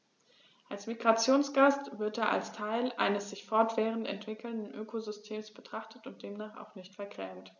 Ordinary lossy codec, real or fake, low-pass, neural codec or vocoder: none; real; 7.2 kHz; none